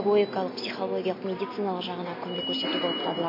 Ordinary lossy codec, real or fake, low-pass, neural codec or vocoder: MP3, 24 kbps; real; 5.4 kHz; none